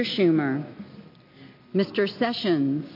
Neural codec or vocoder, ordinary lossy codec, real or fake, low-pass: none; MP3, 32 kbps; real; 5.4 kHz